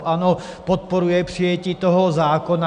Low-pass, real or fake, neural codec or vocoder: 9.9 kHz; real; none